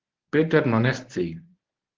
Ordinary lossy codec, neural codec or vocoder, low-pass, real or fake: Opus, 16 kbps; codec, 24 kHz, 0.9 kbps, WavTokenizer, medium speech release version 1; 7.2 kHz; fake